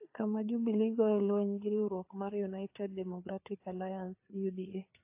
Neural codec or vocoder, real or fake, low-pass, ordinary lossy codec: codec, 16 kHz, 4 kbps, FreqCodec, larger model; fake; 3.6 kHz; AAC, 32 kbps